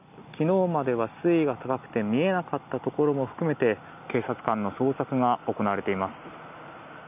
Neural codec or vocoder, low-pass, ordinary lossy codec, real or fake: none; 3.6 kHz; none; real